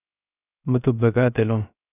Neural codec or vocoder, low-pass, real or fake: codec, 16 kHz, 0.3 kbps, FocalCodec; 3.6 kHz; fake